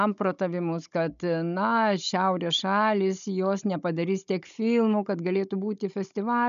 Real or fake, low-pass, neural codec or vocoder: fake; 7.2 kHz; codec, 16 kHz, 16 kbps, FunCodec, trained on Chinese and English, 50 frames a second